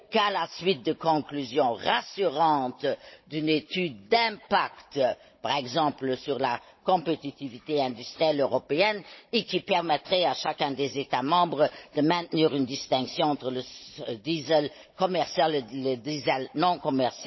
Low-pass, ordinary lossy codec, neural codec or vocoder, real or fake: 7.2 kHz; MP3, 24 kbps; codec, 16 kHz, 16 kbps, FunCodec, trained on Chinese and English, 50 frames a second; fake